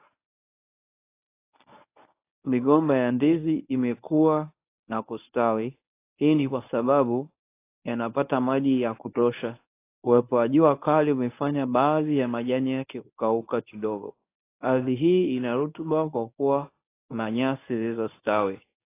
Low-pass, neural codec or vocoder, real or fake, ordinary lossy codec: 3.6 kHz; codec, 24 kHz, 0.9 kbps, WavTokenizer, medium speech release version 1; fake; AAC, 24 kbps